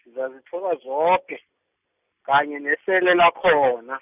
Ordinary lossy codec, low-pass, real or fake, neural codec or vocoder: none; 3.6 kHz; real; none